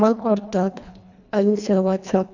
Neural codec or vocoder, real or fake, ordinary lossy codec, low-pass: codec, 24 kHz, 1.5 kbps, HILCodec; fake; none; 7.2 kHz